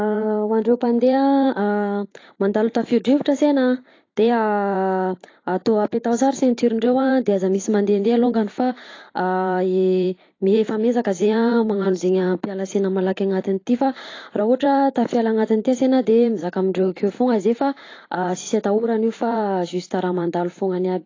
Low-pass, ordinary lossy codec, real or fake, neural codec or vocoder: 7.2 kHz; AAC, 32 kbps; fake; vocoder, 44.1 kHz, 128 mel bands every 512 samples, BigVGAN v2